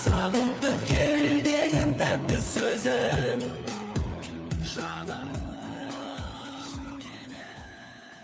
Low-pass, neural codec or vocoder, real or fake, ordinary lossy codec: none; codec, 16 kHz, 4 kbps, FunCodec, trained on LibriTTS, 50 frames a second; fake; none